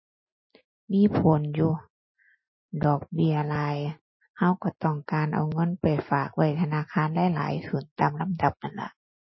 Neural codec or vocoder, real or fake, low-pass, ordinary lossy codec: none; real; 7.2 kHz; MP3, 24 kbps